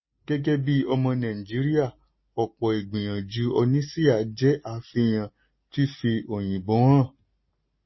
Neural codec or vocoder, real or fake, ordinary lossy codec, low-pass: none; real; MP3, 24 kbps; 7.2 kHz